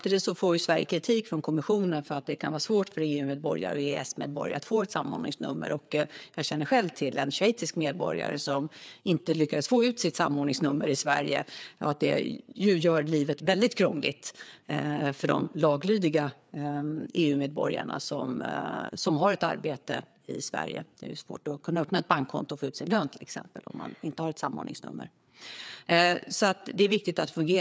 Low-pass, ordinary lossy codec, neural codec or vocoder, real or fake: none; none; codec, 16 kHz, 4 kbps, FreqCodec, larger model; fake